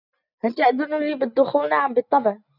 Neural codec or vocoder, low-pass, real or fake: none; 5.4 kHz; real